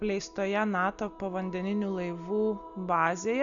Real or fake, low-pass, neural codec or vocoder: real; 7.2 kHz; none